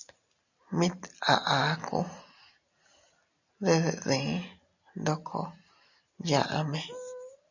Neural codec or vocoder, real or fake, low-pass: none; real; 7.2 kHz